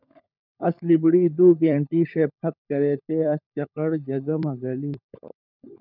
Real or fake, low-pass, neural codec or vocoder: fake; 5.4 kHz; codec, 16 kHz, 16 kbps, FunCodec, trained on LibriTTS, 50 frames a second